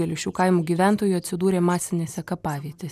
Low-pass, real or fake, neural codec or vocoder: 14.4 kHz; real; none